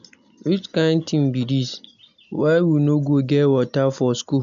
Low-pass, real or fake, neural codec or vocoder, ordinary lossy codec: 7.2 kHz; real; none; none